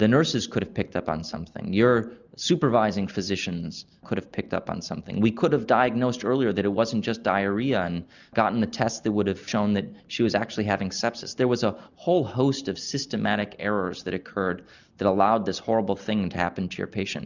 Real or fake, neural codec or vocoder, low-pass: real; none; 7.2 kHz